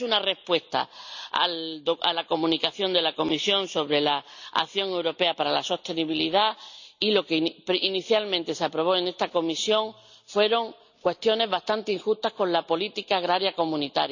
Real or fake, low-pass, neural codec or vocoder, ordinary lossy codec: real; 7.2 kHz; none; none